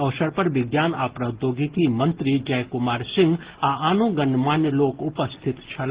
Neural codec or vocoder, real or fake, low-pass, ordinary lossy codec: none; real; 3.6 kHz; Opus, 16 kbps